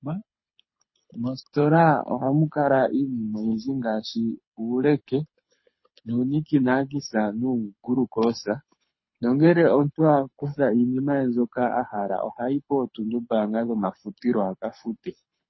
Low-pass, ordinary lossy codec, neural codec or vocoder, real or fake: 7.2 kHz; MP3, 24 kbps; codec, 24 kHz, 6 kbps, HILCodec; fake